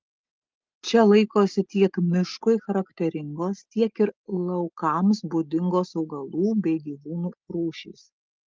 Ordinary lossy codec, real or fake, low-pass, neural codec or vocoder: Opus, 24 kbps; real; 7.2 kHz; none